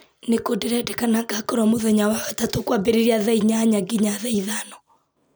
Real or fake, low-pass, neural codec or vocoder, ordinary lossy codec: real; none; none; none